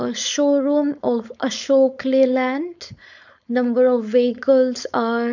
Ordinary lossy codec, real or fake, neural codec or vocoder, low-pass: none; fake; codec, 16 kHz, 4.8 kbps, FACodec; 7.2 kHz